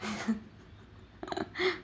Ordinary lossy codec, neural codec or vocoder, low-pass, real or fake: none; none; none; real